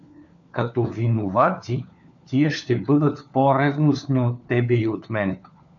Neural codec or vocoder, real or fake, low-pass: codec, 16 kHz, 4 kbps, FunCodec, trained on LibriTTS, 50 frames a second; fake; 7.2 kHz